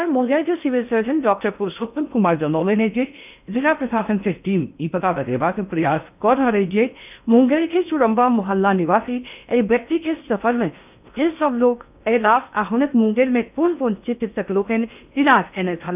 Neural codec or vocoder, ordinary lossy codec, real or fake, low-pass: codec, 16 kHz in and 24 kHz out, 0.6 kbps, FocalCodec, streaming, 4096 codes; none; fake; 3.6 kHz